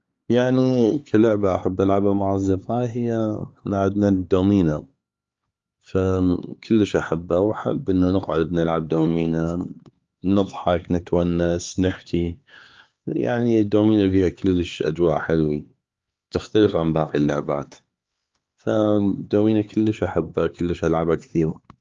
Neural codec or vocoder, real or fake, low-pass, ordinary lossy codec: codec, 16 kHz, 4 kbps, X-Codec, HuBERT features, trained on LibriSpeech; fake; 7.2 kHz; Opus, 32 kbps